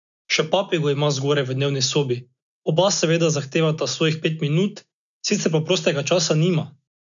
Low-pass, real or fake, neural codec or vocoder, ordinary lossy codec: 7.2 kHz; real; none; none